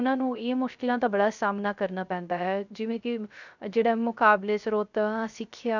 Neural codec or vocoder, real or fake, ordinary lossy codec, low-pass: codec, 16 kHz, 0.3 kbps, FocalCodec; fake; none; 7.2 kHz